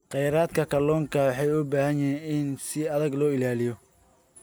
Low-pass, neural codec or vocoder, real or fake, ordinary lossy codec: none; none; real; none